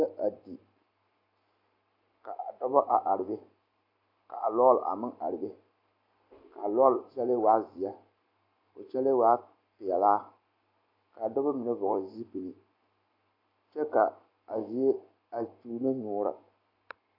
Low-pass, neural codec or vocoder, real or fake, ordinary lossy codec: 5.4 kHz; none; real; AAC, 32 kbps